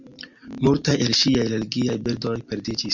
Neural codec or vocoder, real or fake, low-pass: none; real; 7.2 kHz